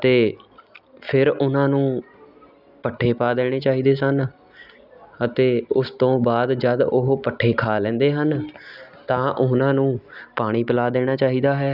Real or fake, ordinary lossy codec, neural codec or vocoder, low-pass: real; none; none; 5.4 kHz